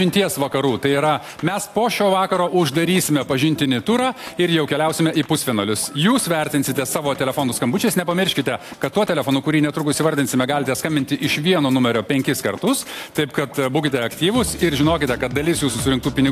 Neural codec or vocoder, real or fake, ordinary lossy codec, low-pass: none; real; AAC, 64 kbps; 14.4 kHz